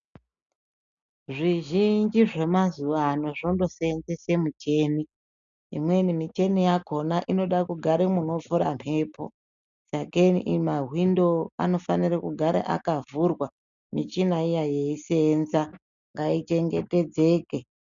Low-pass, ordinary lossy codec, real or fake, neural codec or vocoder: 7.2 kHz; MP3, 96 kbps; real; none